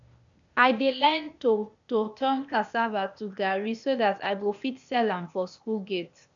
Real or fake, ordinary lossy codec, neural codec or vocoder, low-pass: fake; none; codec, 16 kHz, 0.8 kbps, ZipCodec; 7.2 kHz